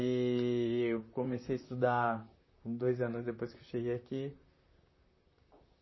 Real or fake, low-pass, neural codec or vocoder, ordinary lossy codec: fake; 7.2 kHz; vocoder, 44.1 kHz, 128 mel bands, Pupu-Vocoder; MP3, 24 kbps